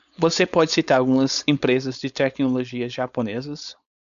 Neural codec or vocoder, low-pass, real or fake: codec, 16 kHz, 4.8 kbps, FACodec; 7.2 kHz; fake